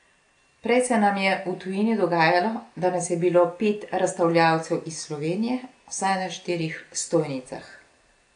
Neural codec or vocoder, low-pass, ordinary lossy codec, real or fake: none; 9.9 kHz; AAC, 48 kbps; real